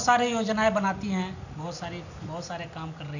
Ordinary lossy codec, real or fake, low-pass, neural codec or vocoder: none; real; 7.2 kHz; none